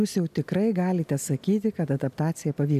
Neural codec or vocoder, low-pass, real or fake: none; 14.4 kHz; real